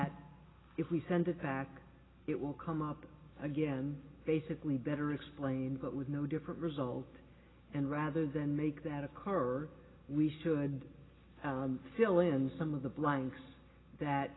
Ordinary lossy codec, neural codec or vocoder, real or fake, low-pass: AAC, 16 kbps; none; real; 7.2 kHz